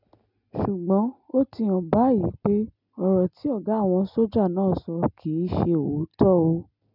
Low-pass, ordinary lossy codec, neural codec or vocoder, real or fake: 5.4 kHz; none; none; real